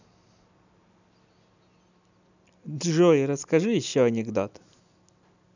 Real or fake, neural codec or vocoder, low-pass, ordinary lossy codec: real; none; 7.2 kHz; none